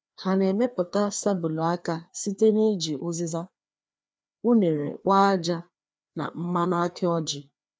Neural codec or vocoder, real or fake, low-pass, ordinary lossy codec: codec, 16 kHz, 2 kbps, FreqCodec, larger model; fake; none; none